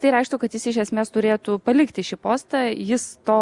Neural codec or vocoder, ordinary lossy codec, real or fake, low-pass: none; Opus, 64 kbps; real; 10.8 kHz